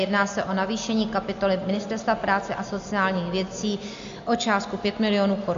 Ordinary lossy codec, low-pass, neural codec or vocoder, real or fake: MP3, 48 kbps; 7.2 kHz; none; real